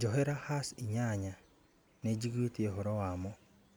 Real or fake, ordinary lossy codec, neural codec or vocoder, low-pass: real; none; none; none